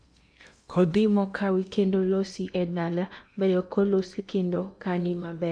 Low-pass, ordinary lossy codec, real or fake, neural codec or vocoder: 9.9 kHz; none; fake; codec, 16 kHz in and 24 kHz out, 0.8 kbps, FocalCodec, streaming, 65536 codes